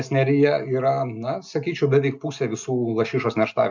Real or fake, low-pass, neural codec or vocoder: real; 7.2 kHz; none